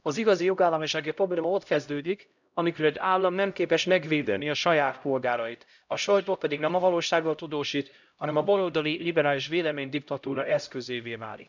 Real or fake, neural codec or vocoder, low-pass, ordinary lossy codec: fake; codec, 16 kHz, 0.5 kbps, X-Codec, HuBERT features, trained on LibriSpeech; 7.2 kHz; none